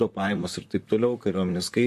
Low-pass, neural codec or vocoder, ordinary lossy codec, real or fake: 14.4 kHz; vocoder, 44.1 kHz, 128 mel bands, Pupu-Vocoder; MP3, 64 kbps; fake